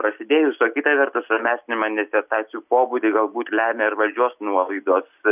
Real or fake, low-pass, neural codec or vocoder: real; 3.6 kHz; none